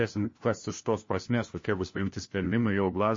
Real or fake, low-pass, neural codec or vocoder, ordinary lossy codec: fake; 7.2 kHz; codec, 16 kHz, 1 kbps, FunCodec, trained on LibriTTS, 50 frames a second; MP3, 32 kbps